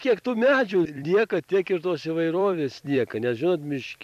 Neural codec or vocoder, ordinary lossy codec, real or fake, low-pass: vocoder, 44.1 kHz, 128 mel bands every 256 samples, BigVGAN v2; AAC, 96 kbps; fake; 14.4 kHz